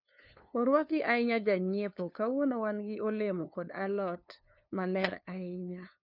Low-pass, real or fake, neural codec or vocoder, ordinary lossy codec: 5.4 kHz; fake; codec, 16 kHz, 2 kbps, FunCodec, trained on LibriTTS, 25 frames a second; none